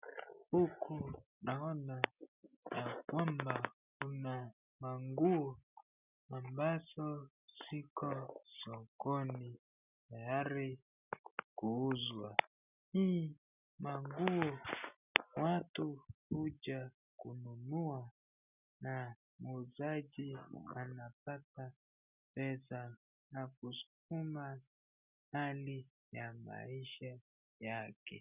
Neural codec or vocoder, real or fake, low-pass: none; real; 3.6 kHz